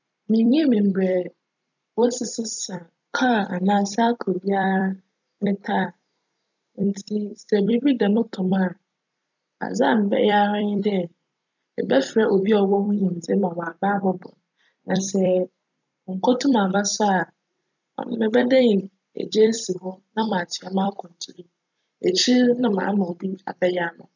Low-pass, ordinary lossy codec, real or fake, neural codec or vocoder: 7.2 kHz; none; fake; vocoder, 44.1 kHz, 128 mel bands every 256 samples, BigVGAN v2